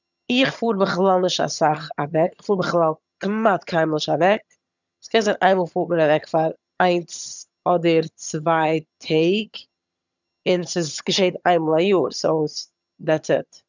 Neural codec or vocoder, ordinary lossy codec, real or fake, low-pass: vocoder, 22.05 kHz, 80 mel bands, HiFi-GAN; none; fake; 7.2 kHz